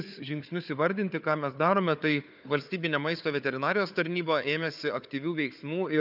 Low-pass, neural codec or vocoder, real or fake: 5.4 kHz; codec, 16 kHz, 4 kbps, FunCodec, trained on Chinese and English, 50 frames a second; fake